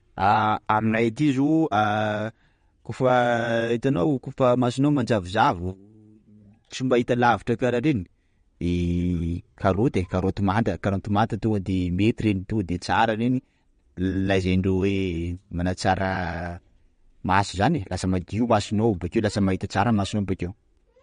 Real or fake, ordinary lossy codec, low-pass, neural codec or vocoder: fake; MP3, 48 kbps; 9.9 kHz; vocoder, 22.05 kHz, 80 mel bands, WaveNeXt